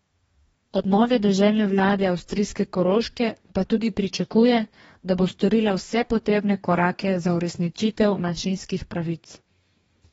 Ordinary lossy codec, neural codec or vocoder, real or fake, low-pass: AAC, 24 kbps; codec, 44.1 kHz, 2.6 kbps, DAC; fake; 19.8 kHz